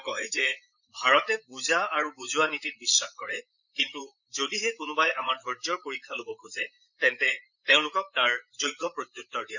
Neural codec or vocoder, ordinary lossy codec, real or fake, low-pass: vocoder, 44.1 kHz, 128 mel bands, Pupu-Vocoder; none; fake; 7.2 kHz